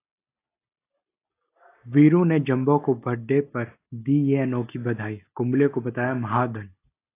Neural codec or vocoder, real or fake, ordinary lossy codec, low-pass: none; real; AAC, 24 kbps; 3.6 kHz